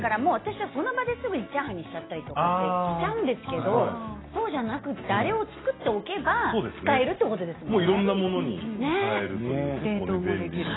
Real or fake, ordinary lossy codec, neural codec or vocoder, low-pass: real; AAC, 16 kbps; none; 7.2 kHz